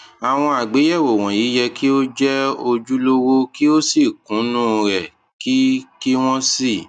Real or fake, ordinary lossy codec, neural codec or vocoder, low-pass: real; none; none; 9.9 kHz